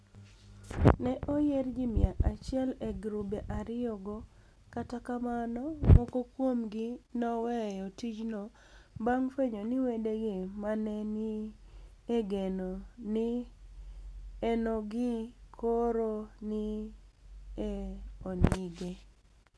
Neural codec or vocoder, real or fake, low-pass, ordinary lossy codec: none; real; none; none